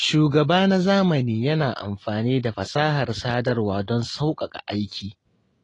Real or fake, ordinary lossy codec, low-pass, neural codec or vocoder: real; AAC, 32 kbps; 10.8 kHz; none